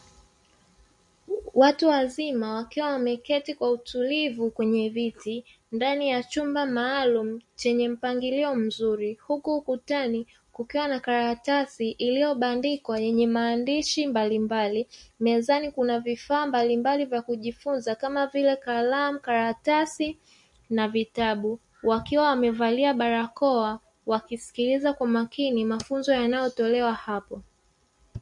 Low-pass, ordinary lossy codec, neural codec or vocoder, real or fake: 10.8 kHz; MP3, 48 kbps; none; real